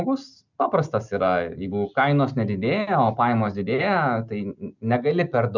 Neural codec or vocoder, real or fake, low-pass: none; real; 7.2 kHz